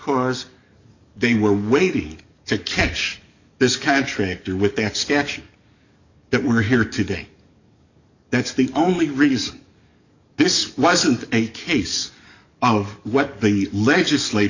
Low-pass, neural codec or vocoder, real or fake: 7.2 kHz; codec, 44.1 kHz, 7.8 kbps, DAC; fake